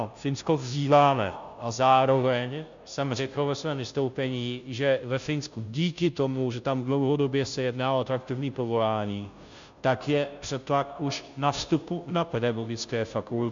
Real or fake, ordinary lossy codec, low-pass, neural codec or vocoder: fake; MP3, 64 kbps; 7.2 kHz; codec, 16 kHz, 0.5 kbps, FunCodec, trained on Chinese and English, 25 frames a second